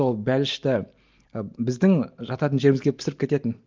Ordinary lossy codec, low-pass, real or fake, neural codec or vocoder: Opus, 24 kbps; 7.2 kHz; real; none